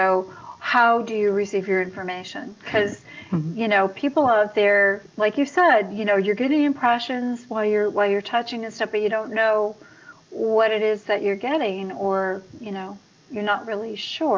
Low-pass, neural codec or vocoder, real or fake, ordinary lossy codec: 7.2 kHz; none; real; Opus, 24 kbps